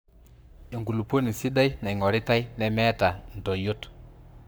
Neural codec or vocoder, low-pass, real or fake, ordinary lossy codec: codec, 44.1 kHz, 7.8 kbps, Pupu-Codec; none; fake; none